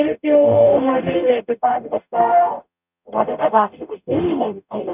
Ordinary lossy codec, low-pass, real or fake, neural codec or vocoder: none; 3.6 kHz; fake; codec, 44.1 kHz, 0.9 kbps, DAC